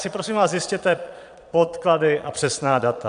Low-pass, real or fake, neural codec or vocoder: 9.9 kHz; fake; vocoder, 22.05 kHz, 80 mel bands, Vocos